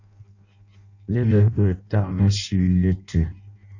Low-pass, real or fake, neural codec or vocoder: 7.2 kHz; fake; codec, 16 kHz in and 24 kHz out, 0.6 kbps, FireRedTTS-2 codec